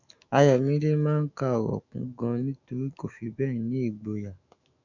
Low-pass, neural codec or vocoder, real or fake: 7.2 kHz; autoencoder, 48 kHz, 128 numbers a frame, DAC-VAE, trained on Japanese speech; fake